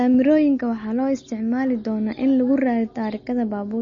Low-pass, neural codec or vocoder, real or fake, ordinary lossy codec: 7.2 kHz; none; real; MP3, 32 kbps